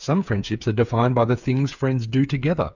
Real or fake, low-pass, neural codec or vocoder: fake; 7.2 kHz; codec, 16 kHz, 8 kbps, FreqCodec, smaller model